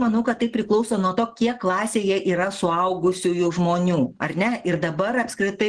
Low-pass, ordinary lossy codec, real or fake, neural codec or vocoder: 9.9 kHz; Opus, 16 kbps; real; none